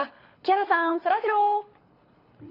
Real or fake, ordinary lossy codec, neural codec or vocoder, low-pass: fake; AAC, 24 kbps; codec, 24 kHz, 6 kbps, HILCodec; 5.4 kHz